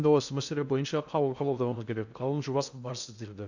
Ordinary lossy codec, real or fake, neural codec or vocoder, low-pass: none; fake; codec, 16 kHz in and 24 kHz out, 0.6 kbps, FocalCodec, streaming, 2048 codes; 7.2 kHz